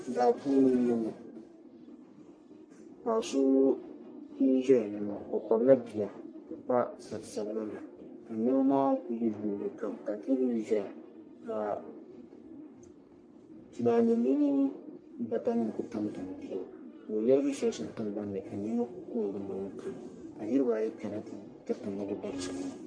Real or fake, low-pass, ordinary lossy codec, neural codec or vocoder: fake; 9.9 kHz; MP3, 48 kbps; codec, 44.1 kHz, 1.7 kbps, Pupu-Codec